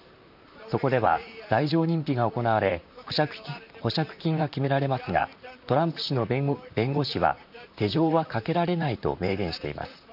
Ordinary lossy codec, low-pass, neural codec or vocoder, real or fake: none; 5.4 kHz; vocoder, 44.1 kHz, 128 mel bands, Pupu-Vocoder; fake